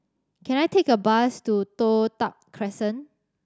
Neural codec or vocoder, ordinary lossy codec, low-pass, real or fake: none; none; none; real